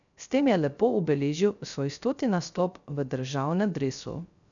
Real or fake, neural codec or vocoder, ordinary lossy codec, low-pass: fake; codec, 16 kHz, 0.3 kbps, FocalCodec; none; 7.2 kHz